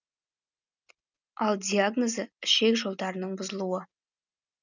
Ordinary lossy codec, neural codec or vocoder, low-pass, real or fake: none; none; 7.2 kHz; real